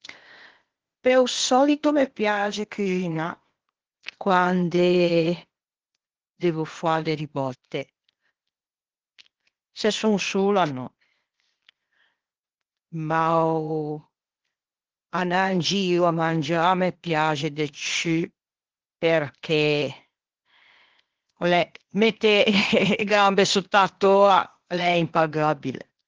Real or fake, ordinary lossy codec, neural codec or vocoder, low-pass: fake; Opus, 16 kbps; codec, 16 kHz, 0.8 kbps, ZipCodec; 7.2 kHz